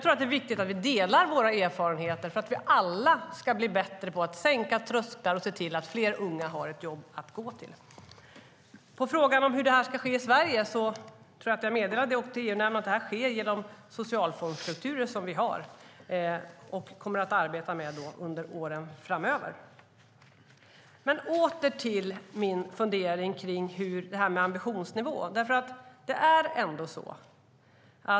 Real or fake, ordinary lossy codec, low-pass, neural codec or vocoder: real; none; none; none